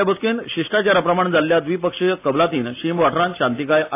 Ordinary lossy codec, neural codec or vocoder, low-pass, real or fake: AAC, 24 kbps; none; 3.6 kHz; real